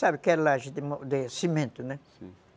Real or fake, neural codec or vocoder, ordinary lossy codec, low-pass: real; none; none; none